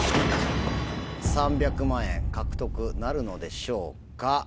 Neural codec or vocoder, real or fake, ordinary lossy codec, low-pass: none; real; none; none